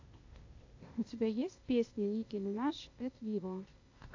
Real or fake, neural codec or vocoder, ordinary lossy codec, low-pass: fake; codec, 16 kHz, 0.8 kbps, ZipCodec; AAC, 48 kbps; 7.2 kHz